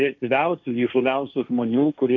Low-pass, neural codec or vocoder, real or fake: 7.2 kHz; codec, 16 kHz, 1.1 kbps, Voila-Tokenizer; fake